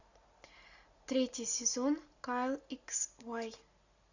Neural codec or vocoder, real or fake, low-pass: none; real; 7.2 kHz